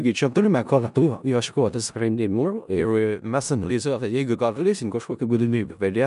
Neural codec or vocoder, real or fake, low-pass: codec, 16 kHz in and 24 kHz out, 0.4 kbps, LongCat-Audio-Codec, four codebook decoder; fake; 10.8 kHz